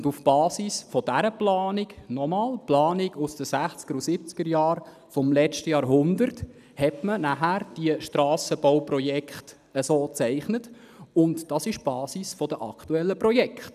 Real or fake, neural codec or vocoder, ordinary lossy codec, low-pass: real; none; none; 14.4 kHz